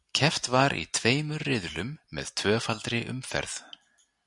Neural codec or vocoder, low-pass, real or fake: none; 10.8 kHz; real